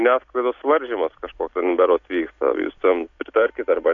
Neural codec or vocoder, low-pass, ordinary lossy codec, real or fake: none; 7.2 kHz; AAC, 48 kbps; real